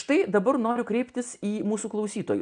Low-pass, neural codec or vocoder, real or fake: 9.9 kHz; none; real